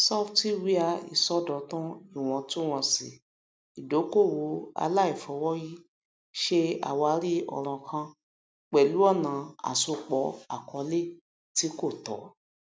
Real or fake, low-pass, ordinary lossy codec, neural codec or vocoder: real; none; none; none